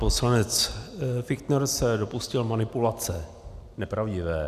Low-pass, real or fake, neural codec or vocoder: 14.4 kHz; real; none